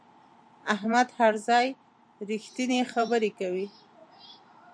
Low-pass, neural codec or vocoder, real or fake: 9.9 kHz; vocoder, 44.1 kHz, 128 mel bands every 512 samples, BigVGAN v2; fake